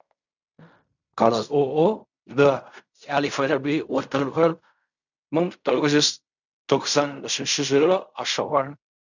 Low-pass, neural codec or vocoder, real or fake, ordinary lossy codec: 7.2 kHz; codec, 16 kHz in and 24 kHz out, 0.4 kbps, LongCat-Audio-Codec, fine tuned four codebook decoder; fake; none